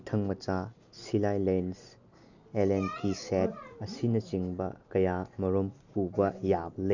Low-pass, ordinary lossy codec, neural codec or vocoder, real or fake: 7.2 kHz; none; none; real